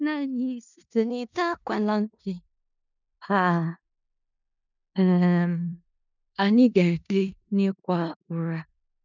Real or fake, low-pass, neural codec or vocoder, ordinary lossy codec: fake; 7.2 kHz; codec, 16 kHz in and 24 kHz out, 0.4 kbps, LongCat-Audio-Codec, four codebook decoder; none